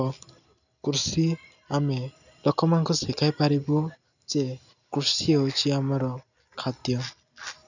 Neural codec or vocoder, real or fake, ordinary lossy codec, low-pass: none; real; none; 7.2 kHz